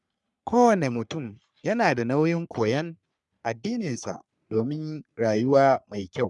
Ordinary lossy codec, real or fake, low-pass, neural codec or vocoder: none; fake; 10.8 kHz; codec, 44.1 kHz, 3.4 kbps, Pupu-Codec